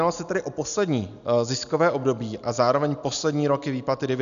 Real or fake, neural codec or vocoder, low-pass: real; none; 7.2 kHz